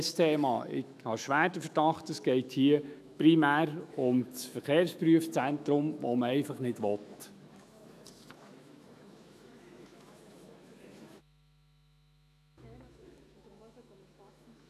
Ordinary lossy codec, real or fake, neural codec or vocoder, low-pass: none; fake; autoencoder, 48 kHz, 128 numbers a frame, DAC-VAE, trained on Japanese speech; 14.4 kHz